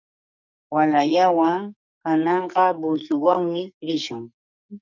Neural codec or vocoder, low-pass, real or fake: codec, 44.1 kHz, 2.6 kbps, SNAC; 7.2 kHz; fake